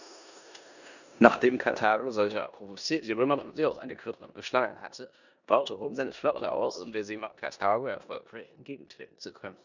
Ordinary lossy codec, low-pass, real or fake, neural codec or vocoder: none; 7.2 kHz; fake; codec, 16 kHz in and 24 kHz out, 0.9 kbps, LongCat-Audio-Codec, four codebook decoder